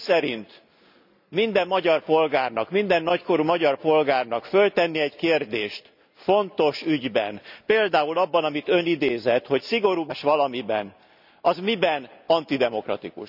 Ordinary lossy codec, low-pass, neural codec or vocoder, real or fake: none; 5.4 kHz; none; real